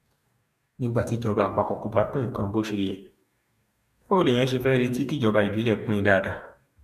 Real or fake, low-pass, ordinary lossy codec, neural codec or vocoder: fake; 14.4 kHz; none; codec, 44.1 kHz, 2.6 kbps, DAC